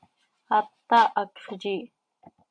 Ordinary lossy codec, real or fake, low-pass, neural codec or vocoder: MP3, 64 kbps; fake; 9.9 kHz; vocoder, 44.1 kHz, 128 mel bands every 512 samples, BigVGAN v2